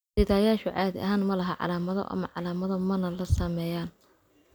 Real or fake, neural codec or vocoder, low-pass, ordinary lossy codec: real; none; none; none